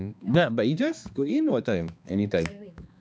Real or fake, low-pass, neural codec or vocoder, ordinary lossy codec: fake; none; codec, 16 kHz, 2 kbps, X-Codec, HuBERT features, trained on balanced general audio; none